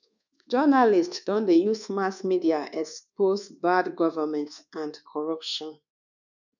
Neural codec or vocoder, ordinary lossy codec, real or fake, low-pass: codec, 24 kHz, 1.2 kbps, DualCodec; none; fake; 7.2 kHz